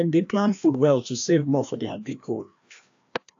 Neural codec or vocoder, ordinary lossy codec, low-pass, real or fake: codec, 16 kHz, 1 kbps, FreqCodec, larger model; AAC, 64 kbps; 7.2 kHz; fake